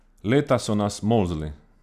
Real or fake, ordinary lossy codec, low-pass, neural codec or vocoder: real; none; 14.4 kHz; none